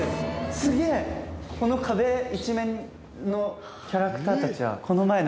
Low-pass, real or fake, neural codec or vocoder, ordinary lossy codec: none; real; none; none